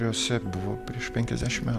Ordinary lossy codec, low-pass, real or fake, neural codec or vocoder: MP3, 96 kbps; 14.4 kHz; real; none